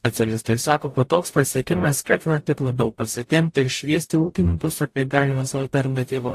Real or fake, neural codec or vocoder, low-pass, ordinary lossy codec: fake; codec, 44.1 kHz, 0.9 kbps, DAC; 14.4 kHz; AAC, 64 kbps